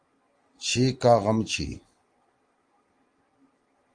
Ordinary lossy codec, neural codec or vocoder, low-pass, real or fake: Opus, 24 kbps; none; 9.9 kHz; real